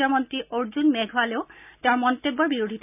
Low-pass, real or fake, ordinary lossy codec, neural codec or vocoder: 3.6 kHz; real; none; none